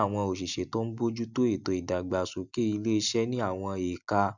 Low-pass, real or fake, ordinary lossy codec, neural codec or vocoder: 7.2 kHz; real; none; none